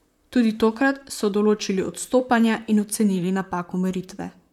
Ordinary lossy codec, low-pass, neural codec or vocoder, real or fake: none; 19.8 kHz; vocoder, 44.1 kHz, 128 mel bands, Pupu-Vocoder; fake